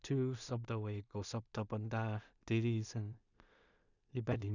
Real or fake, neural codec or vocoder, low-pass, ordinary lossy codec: fake; codec, 16 kHz in and 24 kHz out, 0.4 kbps, LongCat-Audio-Codec, two codebook decoder; 7.2 kHz; none